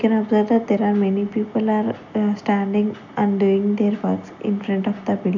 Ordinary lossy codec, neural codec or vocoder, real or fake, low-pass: none; none; real; 7.2 kHz